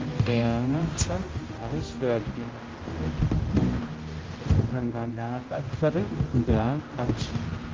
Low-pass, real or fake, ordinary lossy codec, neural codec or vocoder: 7.2 kHz; fake; Opus, 32 kbps; codec, 16 kHz, 0.5 kbps, X-Codec, HuBERT features, trained on general audio